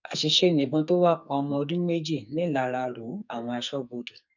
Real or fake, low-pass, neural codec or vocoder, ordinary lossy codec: fake; 7.2 kHz; codec, 44.1 kHz, 2.6 kbps, SNAC; none